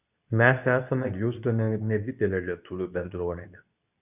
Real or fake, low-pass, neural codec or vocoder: fake; 3.6 kHz; codec, 24 kHz, 0.9 kbps, WavTokenizer, medium speech release version 2